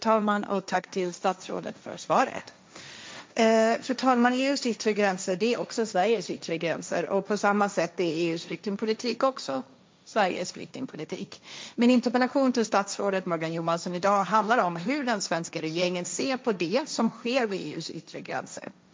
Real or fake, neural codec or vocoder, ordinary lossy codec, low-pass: fake; codec, 16 kHz, 1.1 kbps, Voila-Tokenizer; none; none